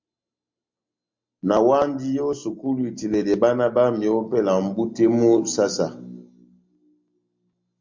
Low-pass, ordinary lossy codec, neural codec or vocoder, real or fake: 7.2 kHz; MP3, 48 kbps; none; real